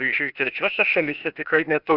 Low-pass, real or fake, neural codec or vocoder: 5.4 kHz; fake; codec, 16 kHz, 0.8 kbps, ZipCodec